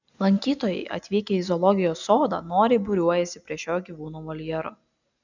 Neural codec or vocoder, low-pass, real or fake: none; 7.2 kHz; real